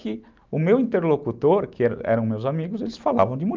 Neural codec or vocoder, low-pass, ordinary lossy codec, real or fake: none; 7.2 kHz; Opus, 24 kbps; real